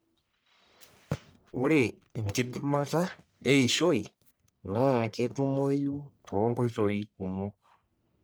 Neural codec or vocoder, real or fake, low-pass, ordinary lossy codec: codec, 44.1 kHz, 1.7 kbps, Pupu-Codec; fake; none; none